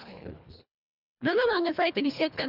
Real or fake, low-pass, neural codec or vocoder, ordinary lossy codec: fake; 5.4 kHz; codec, 24 kHz, 1.5 kbps, HILCodec; none